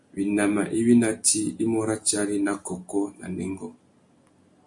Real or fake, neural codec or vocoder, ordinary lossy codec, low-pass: real; none; MP3, 48 kbps; 10.8 kHz